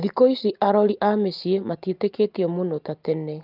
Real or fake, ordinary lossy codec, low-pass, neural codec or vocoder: real; Opus, 32 kbps; 5.4 kHz; none